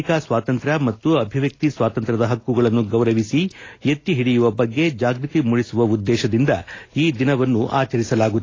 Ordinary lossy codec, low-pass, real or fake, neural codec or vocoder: AAC, 32 kbps; 7.2 kHz; real; none